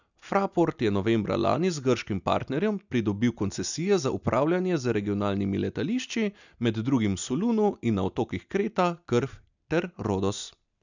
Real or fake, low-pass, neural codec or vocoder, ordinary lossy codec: real; 7.2 kHz; none; none